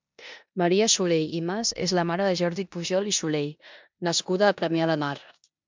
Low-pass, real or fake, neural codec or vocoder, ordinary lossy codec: 7.2 kHz; fake; codec, 16 kHz in and 24 kHz out, 0.9 kbps, LongCat-Audio-Codec, four codebook decoder; MP3, 64 kbps